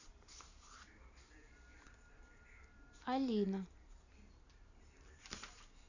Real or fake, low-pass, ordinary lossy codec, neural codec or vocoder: fake; 7.2 kHz; none; vocoder, 44.1 kHz, 128 mel bands every 512 samples, BigVGAN v2